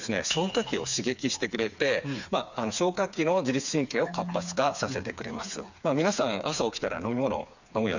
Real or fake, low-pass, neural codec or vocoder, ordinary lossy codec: fake; 7.2 kHz; codec, 16 kHz, 4 kbps, FreqCodec, smaller model; none